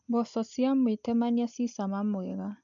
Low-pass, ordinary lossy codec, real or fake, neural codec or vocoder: 7.2 kHz; none; real; none